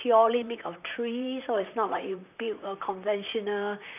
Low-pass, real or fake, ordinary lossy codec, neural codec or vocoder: 3.6 kHz; fake; none; vocoder, 44.1 kHz, 128 mel bands, Pupu-Vocoder